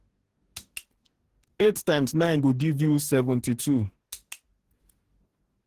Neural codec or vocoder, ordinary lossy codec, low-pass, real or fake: codec, 44.1 kHz, 2.6 kbps, DAC; Opus, 16 kbps; 14.4 kHz; fake